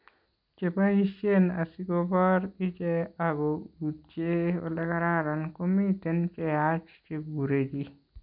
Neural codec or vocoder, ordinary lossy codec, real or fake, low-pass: none; none; real; 5.4 kHz